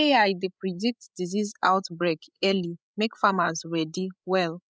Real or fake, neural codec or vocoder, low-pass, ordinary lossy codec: fake; codec, 16 kHz, 16 kbps, FreqCodec, larger model; none; none